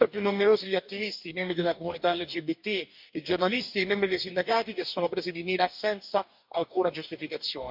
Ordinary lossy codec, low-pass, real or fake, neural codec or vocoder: MP3, 48 kbps; 5.4 kHz; fake; codec, 44.1 kHz, 2.6 kbps, DAC